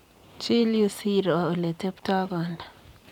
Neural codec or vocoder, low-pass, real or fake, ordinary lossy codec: vocoder, 44.1 kHz, 128 mel bands every 512 samples, BigVGAN v2; 19.8 kHz; fake; none